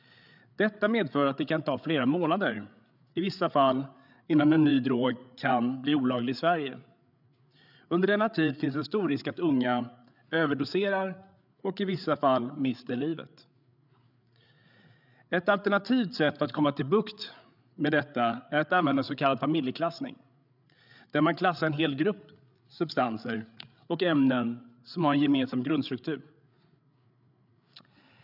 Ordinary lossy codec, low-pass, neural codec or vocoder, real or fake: none; 5.4 kHz; codec, 16 kHz, 8 kbps, FreqCodec, larger model; fake